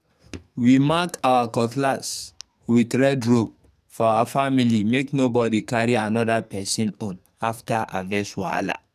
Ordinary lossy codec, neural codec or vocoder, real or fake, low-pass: none; codec, 44.1 kHz, 2.6 kbps, SNAC; fake; 14.4 kHz